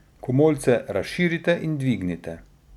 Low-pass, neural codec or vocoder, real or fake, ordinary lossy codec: 19.8 kHz; none; real; none